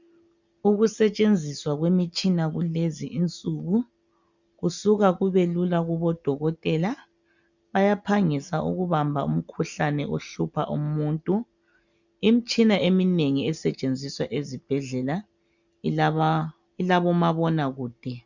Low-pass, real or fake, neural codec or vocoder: 7.2 kHz; real; none